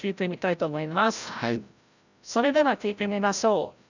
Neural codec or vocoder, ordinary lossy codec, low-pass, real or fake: codec, 16 kHz, 0.5 kbps, FreqCodec, larger model; none; 7.2 kHz; fake